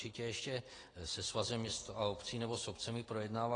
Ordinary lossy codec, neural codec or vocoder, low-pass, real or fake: AAC, 32 kbps; none; 9.9 kHz; real